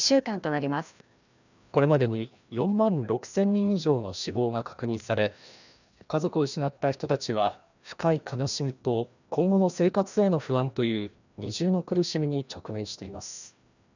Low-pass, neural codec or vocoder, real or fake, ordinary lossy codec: 7.2 kHz; codec, 16 kHz, 1 kbps, FreqCodec, larger model; fake; none